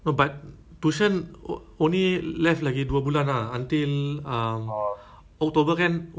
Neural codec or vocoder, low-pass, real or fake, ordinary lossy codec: none; none; real; none